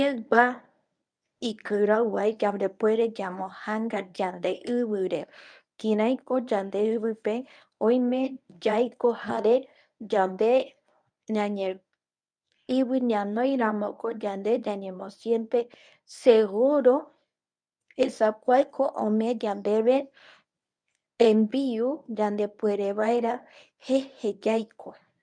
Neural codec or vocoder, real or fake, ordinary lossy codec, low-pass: codec, 24 kHz, 0.9 kbps, WavTokenizer, medium speech release version 1; fake; none; 9.9 kHz